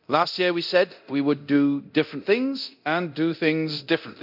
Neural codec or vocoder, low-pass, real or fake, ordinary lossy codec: codec, 24 kHz, 0.9 kbps, DualCodec; 5.4 kHz; fake; none